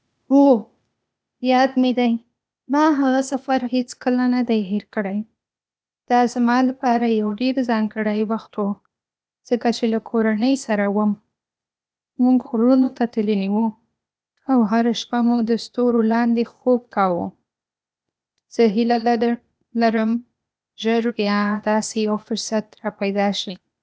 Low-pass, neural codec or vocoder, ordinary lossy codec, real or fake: none; codec, 16 kHz, 0.8 kbps, ZipCodec; none; fake